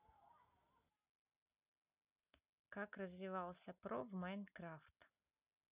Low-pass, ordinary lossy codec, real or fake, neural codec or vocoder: 3.6 kHz; none; real; none